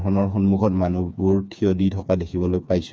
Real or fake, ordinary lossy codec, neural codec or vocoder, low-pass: fake; none; codec, 16 kHz, 4 kbps, FreqCodec, smaller model; none